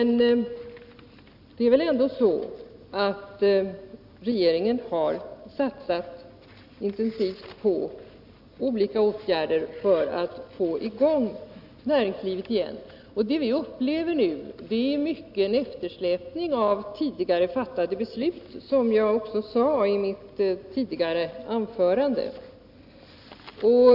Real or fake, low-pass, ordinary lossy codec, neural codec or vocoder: real; 5.4 kHz; none; none